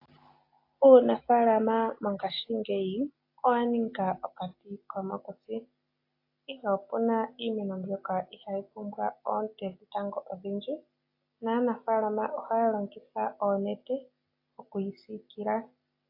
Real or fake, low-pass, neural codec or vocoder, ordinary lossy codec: real; 5.4 kHz; none; AAC, 32 kbps